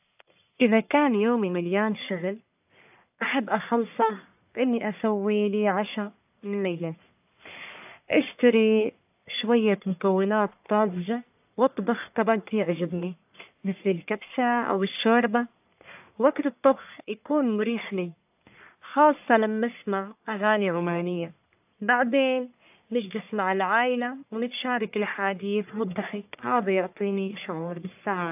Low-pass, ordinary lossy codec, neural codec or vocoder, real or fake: 3.6 kHz; AAC, 32 kbps; codec, 44.1 kHz, 1.7 kbps, Pupu-Codec; fake